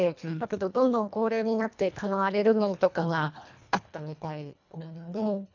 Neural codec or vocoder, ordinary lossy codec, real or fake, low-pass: codec, 24 kHz, 1.5 kbps, HILCodec; none; fake; 7.2 kHz